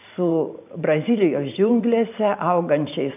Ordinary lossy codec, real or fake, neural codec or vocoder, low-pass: AAC, 32 kbps; fake; vocoder, 44.1 kHz, 128 mel bands every 512 samples, BigVGAN v2; 3.6 kHz